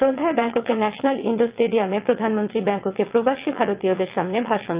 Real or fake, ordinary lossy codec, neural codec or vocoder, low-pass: fake; Opus, 32 kbps; vocoder, 22.05 kHz, 80 mel bands, WaveNeXt; 3.6 kHz